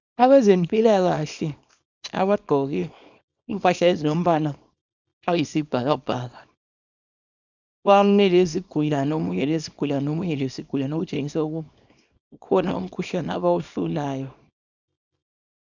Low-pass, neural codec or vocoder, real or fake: 7.2 kHz; codec, 24 kHz, 0.9 kbps, WavTokenizer, small release; fake